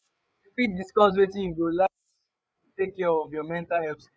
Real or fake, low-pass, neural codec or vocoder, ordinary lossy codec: fake; none; codec, 16 kHz, 8 kbps, FreqCodec, larger model; none